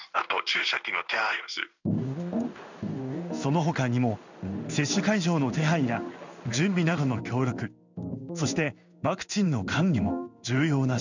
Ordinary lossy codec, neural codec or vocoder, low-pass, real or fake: none; codec, 16 kHz in and 24 kHz out, 1 kbps, XY-Tokenizer; 7.2 kHz; fake